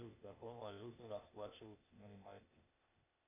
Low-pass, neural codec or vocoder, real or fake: 3.6 kHz; codec, 16 kHz, 0.8 kbps, ZipCodec; fake